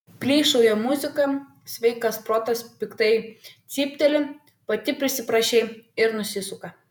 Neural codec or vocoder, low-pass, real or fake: vocoder, 48 kHz, 128 mel bands, Vocos; 19.8 kHz; fake